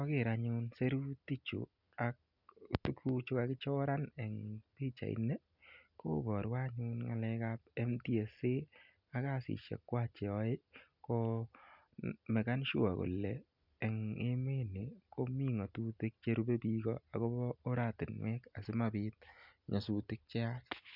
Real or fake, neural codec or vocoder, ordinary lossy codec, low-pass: real; none; none; 5.4 kHz